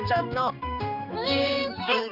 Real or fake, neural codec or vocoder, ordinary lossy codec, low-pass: fake; codec, 16 kHz, 2 kbps, X-Codec, HuBERT features, trained on general audio; none; 5.4 kHz